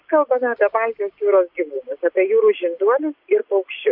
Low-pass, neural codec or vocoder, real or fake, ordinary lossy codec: 5.4 kHz; none; real; AAC, 48 kbps